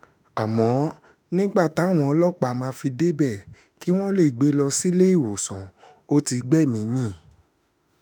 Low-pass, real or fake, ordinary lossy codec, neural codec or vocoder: none; fake; none; autoencoder, 48 kHz, 32 numbers a frame, DAC-VAE, trained on Japanese speech